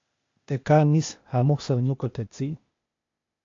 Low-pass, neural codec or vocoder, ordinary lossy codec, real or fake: 7.2 kHz; codec, 16 kHz, 0.8 kbps, ZipCodec; AAC, 48 kbps; fake